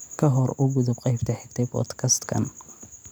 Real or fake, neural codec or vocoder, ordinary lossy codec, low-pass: real; none; none; none